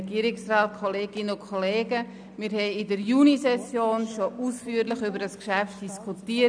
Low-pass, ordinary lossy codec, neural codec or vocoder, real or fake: 9.9 kHz; none; none; real